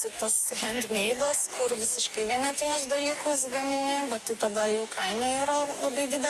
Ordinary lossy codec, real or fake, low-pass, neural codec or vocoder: Opus, 64 kbps; fake; 14.4 kHz; codec, 44.1 kHz, 2.6 kbps, DAC